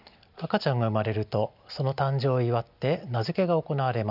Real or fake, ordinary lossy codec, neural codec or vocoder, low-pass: real; none; none; 5.4 kHz